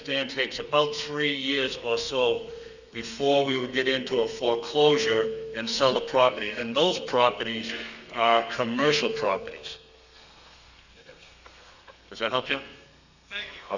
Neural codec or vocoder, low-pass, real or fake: codec, 32 kHz, 1.9 kbps, SNAC; 7.2 kHz; fake